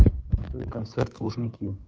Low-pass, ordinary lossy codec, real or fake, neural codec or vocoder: none; none; fake; codec, 16 kHz, 2 kbps, FunCodec, trained on Chinese and English, 25 frames a second